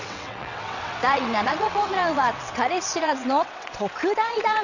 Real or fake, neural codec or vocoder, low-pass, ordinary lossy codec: fake; vocoder, 22.05 kHz, 80 mel bands, WaveNeXt; 7.2 kHz; none